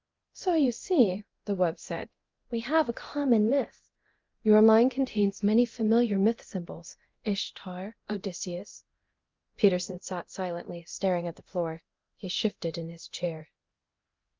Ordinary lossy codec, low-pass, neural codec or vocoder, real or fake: Opus, 32 kbps; 7.2 kHz; codec, 24 kHz, 0.9 kbps, DualCodec; fake